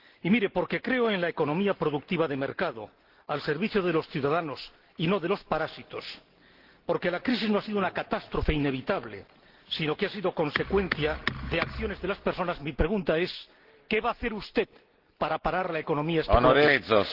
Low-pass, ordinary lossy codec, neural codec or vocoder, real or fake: 5.4 kHz; Opus, 16 kbps; none; real